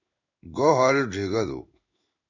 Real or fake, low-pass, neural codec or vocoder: fake; 7.2 kHz; codec, 16 kHz in and 24 kHz out, 1 kbps, XY-Tokenizer